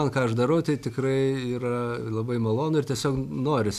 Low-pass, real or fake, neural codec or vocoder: 14.4 kHz; real; none